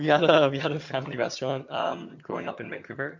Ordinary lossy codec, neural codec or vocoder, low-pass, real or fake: MP3, 48 kbps; vocoder, 22.05 kHz, 80 mel bands, HiFi-GAN; 7.2 kHz; fake